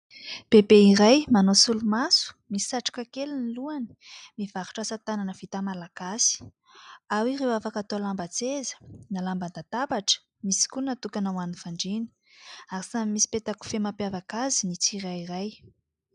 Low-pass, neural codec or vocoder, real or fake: 10.8 kHz; none; real